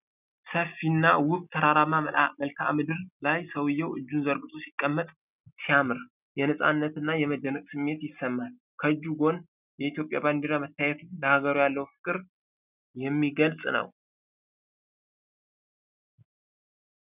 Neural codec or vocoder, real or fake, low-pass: none; real; 3.6 kHz